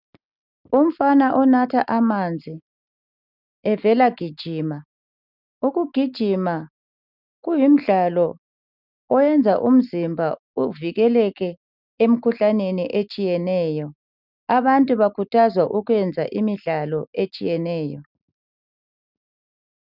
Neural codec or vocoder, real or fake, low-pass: none; real; 5.4 kHz